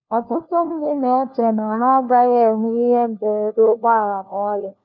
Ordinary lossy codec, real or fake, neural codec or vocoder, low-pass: none; fake; codec, 16 kHz, 1 kbps, FunCodec, trained on LibriTTS, 50 frames a second; 7.2 kHz